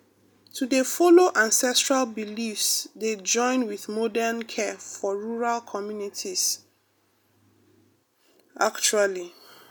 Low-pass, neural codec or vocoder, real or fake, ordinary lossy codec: none; none; real; none